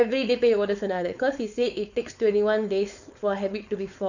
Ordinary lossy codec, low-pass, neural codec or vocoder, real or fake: none; 7.2 kHz; codec, 16 kHz, 4.8 kbps, FACodec; fake